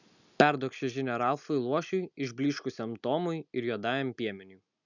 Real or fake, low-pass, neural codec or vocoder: real; 7.2 kHz; none